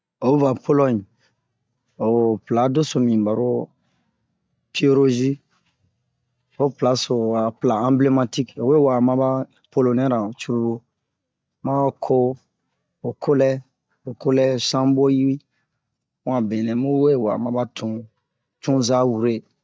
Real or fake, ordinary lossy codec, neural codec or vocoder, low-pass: real; none; none; none